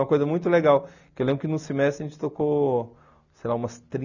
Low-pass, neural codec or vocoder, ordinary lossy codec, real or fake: 7.2 kHz; none; none; real